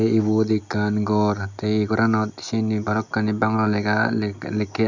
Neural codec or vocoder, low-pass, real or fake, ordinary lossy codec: none; 7.2 kHz; real; MP3, 64 kbps